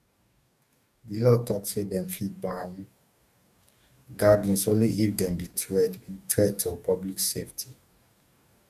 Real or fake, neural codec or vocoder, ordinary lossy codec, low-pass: fake; codec, 44.1 kHz, 2.6 kbps, DAC; none; 14.4 kHz